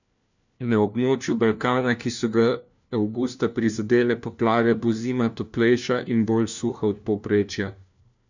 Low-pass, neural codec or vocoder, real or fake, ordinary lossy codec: 7.2 kHz; codec, 16 kHz, 1 kbps, FunCodec, trained on LibriTTS, 50 frames a second; fake; none